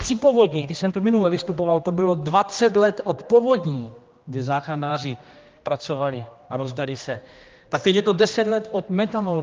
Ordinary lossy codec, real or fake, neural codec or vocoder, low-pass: Opus, 24 kbps; fake; codec, 16 kHz, 1 kbps, X-Codec, HuBERT features, trained on general audio; 7.2 kHz